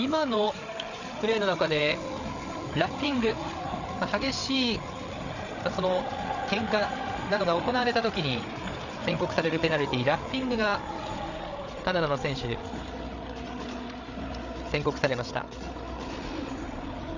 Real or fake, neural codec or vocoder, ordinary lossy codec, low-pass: fake; codec, 16 kHz, 8 kbps, FreqCodec, larger model; none; 7.2 kHz